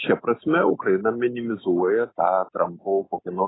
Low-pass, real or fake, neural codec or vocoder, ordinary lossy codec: 7.2 kHz; real; none; AAC, 16 kbps